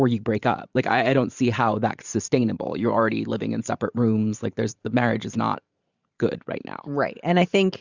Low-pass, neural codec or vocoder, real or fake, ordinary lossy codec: 7.2 kHz; vocoder, 44.1 kHz, 128 mel bands every 512 samples, BigVGAN v2; fake; Opus, 64 kbps